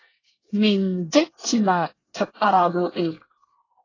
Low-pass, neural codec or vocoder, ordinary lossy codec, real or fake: 7.2 kHz; codec, 24 kHz, 1 kbps, SNAC; AAC, 32 kbps; fake